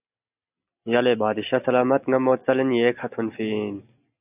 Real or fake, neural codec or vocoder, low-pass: real; none; 3.6 kHz